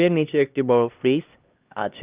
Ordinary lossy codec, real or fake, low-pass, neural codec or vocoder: Opus, 24 kbps; fake; 3.6 kHz; codec, 16 kHz, 1 kbps, X-Codec, HuBERT features, trained on LibriSpeech